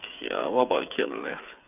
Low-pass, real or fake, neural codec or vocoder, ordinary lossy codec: 3.6 kHz; fake; codec, 16 kHz, 8 kbps, FreqCodec, smaller model; none